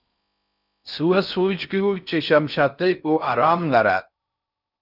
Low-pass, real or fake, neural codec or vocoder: 5.4 kHz; fake; codec, 16 kHz in and 24 kHz out, 0.6 kbps, FocalCodec, streaming, 4096 codes